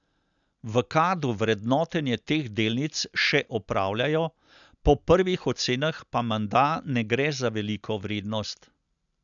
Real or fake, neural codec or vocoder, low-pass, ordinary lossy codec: real; none; 7.2 kHz; none